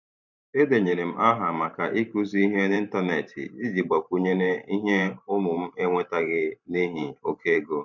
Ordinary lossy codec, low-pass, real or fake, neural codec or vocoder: none; 7.2 kHz; fake; vocoder, 44.1 kHz, 128 mel bands every 512 samples, BigVGAN v2